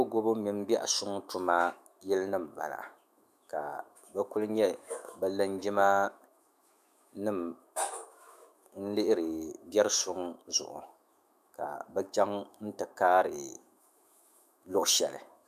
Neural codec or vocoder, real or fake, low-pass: autoencoder, 48 kHz, 128 numbers a frame, DAC-VAE, trained on Japanese speech; fake; 14.4 kHz